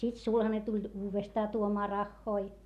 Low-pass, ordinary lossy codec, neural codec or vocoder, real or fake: 14.4 kHz; none; none; real